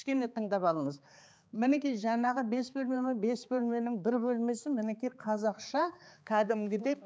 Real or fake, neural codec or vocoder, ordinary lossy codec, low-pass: fake; codec, 16 kHz, 4 kbps, X-Codec, HuBERT features, trained on balanced general audio; none; none